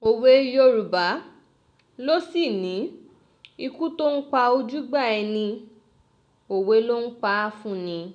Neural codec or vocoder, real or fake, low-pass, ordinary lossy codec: none; real; 9.9 kHz; none